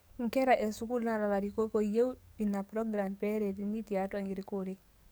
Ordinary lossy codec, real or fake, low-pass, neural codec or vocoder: none; fake; none; codec, 44.1 kHz, 7.8 kbps, DAC